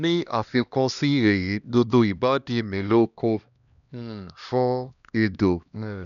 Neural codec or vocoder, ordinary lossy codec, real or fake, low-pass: codec, 16 kHz, 1 kbps, X-Codec, HuBERT features, trained on LibriSpeech; none; fake; 7.2 kHz